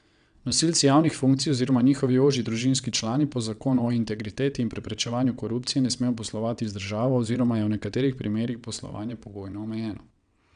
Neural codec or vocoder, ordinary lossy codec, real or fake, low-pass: vocoder, 22.05 kHz, 80 mel bands, WaveNeXt; none; fake; 9.9 kHz